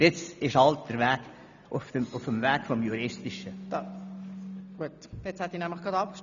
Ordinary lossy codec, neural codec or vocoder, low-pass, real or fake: none; none; 7.2 kHz; real